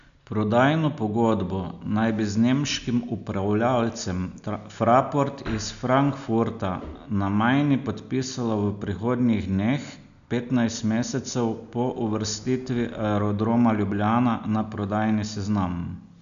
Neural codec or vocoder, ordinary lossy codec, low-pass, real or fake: none; none; 7.2 kHz; real